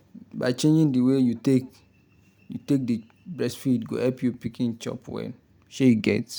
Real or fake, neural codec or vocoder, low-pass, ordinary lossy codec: real; none; none; none